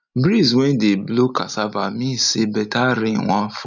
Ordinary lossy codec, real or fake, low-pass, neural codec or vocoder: none; real; 7.2 kHz; none